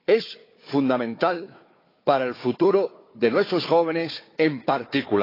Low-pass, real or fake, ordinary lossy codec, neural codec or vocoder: 5.4 kHz; fake; AAC, 24 kbps; codec, 16 kHz, 4 kbps, FunCodec, trained on Chinese and English, 50 frames a second